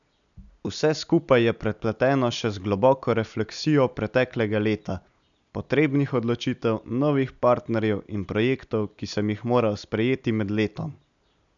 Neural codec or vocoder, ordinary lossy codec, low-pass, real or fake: none; none; 7.2 kHz; real